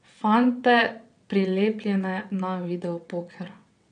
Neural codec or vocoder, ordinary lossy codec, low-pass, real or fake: vocoder, 22.05 kHz, 80 mel bands, WaveNeXt; none; 9.9 kHz; fake